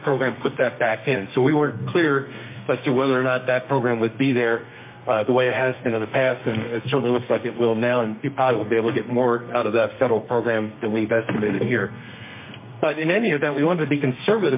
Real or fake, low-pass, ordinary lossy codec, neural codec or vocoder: fake; 3.6 kHz; MP3, 24 kbps; codec, 32 kHz, 1.9 kbps, SNAC